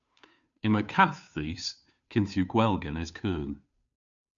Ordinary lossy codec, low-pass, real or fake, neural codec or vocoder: MP3, 96 kbps; 7.2 kHz; fake; codec, 16 kHz, 2 kbps, FunCodec, trained on Chinese and English, 25 frames a second